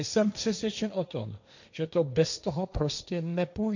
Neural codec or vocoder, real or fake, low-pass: codec, 16 kHz, 1.1 kbps, Voila-Tokenizer; fake; 7.2 kHz